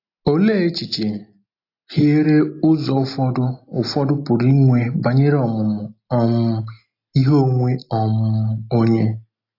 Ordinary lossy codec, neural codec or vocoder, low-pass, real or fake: AAC, 32 kbps; none; 5.4 kHz; real